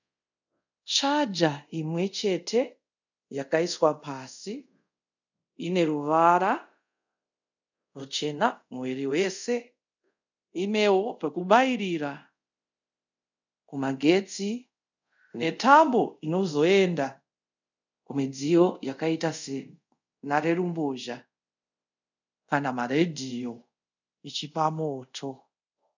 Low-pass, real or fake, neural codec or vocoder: 7.2 kHz; fake; codec, 24 kHz, 0.5 kbps, DualCodec